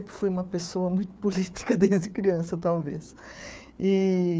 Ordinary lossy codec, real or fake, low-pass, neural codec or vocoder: none; fake; none; codec, 16 kHz, 4 kbps, FreqCodec, larger model